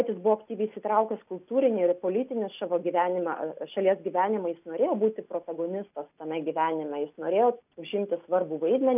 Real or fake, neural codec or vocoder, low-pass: real; none; 3.6 kHz